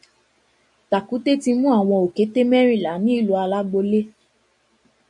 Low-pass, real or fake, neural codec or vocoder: 10.8 kHz; real; none